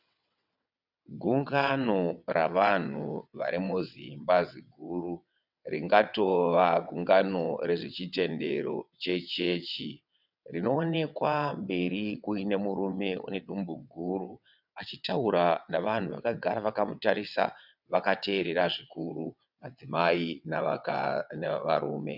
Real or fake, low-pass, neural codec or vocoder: fake; 5.4 kHz; vocoder, 22.05 kHz, 80 mel bands, WaveNeXt